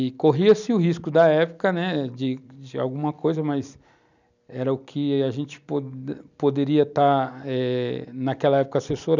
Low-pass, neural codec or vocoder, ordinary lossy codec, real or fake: 7.2 kHz; none; none; real